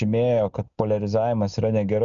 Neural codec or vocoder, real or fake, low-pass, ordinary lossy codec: none; real; 7.2 kHz; MP3, 96 kbps